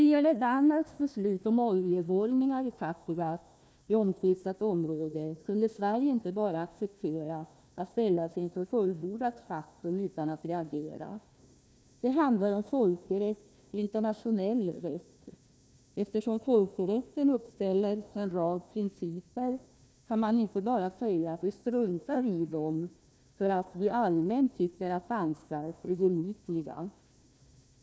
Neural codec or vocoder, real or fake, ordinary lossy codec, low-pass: codec, 16 kHz, 1 kbps, FunCodec, trained on Chinese and English, 50 frames a second; fake; none; none